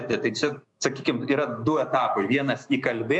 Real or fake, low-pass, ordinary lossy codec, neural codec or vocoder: fake; 10.8 kHz; MP3, 96 kbps; autoencoder, 48 kHz, 128 numbers a frame, DAC-VAE, trained on Japanese speech